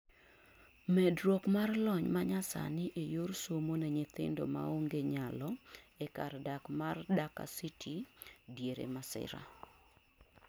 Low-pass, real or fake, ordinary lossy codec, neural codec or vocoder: none; real; none; none